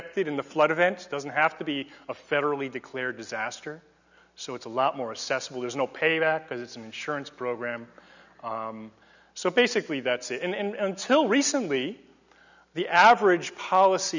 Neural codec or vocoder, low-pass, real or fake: none; 7.2 kHz; real